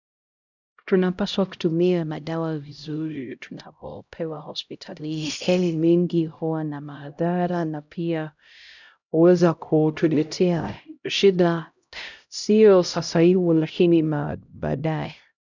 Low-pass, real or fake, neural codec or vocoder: 7.2 kHz; fake; codec, 16 kHz, 0.5 kbps, X-Codec, HuBERT features, trained on LibriSpeech